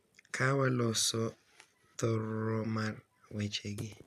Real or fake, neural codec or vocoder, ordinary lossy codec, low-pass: real; none; none; 14.4 kHz